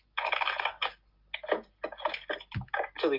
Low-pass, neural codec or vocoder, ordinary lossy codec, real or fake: 5.4 kHz; none; Opus, 24 kbps; real